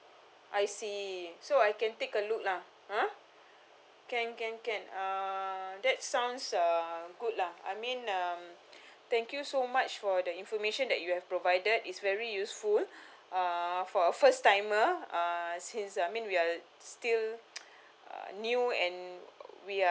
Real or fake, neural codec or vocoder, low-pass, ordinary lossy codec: real; none; none; none